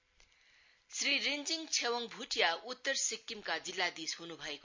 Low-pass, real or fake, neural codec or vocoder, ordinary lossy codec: 7.2 kHz; real; none; MP3, 64 kbps